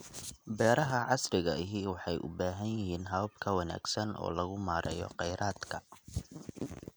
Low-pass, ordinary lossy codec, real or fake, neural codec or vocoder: none; none; real; none